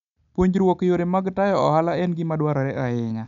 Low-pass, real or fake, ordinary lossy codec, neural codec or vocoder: 7.2 kHz; real; none; none